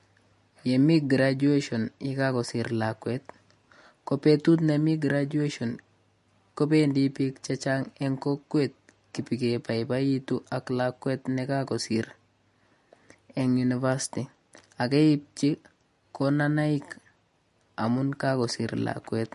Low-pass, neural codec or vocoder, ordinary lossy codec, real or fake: 14.4 kHz; none; MP3, 48 kbps; real